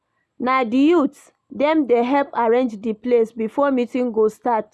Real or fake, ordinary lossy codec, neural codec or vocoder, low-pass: real; none; none; none